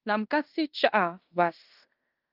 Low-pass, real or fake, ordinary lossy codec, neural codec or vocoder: 5.4 kHz; fake; Opus, 32 kbps; codec, 16 kHz in and 24 kHz out, 0.9 kbps, LongCat-Audio-Codec, fine tuned four codebook decoder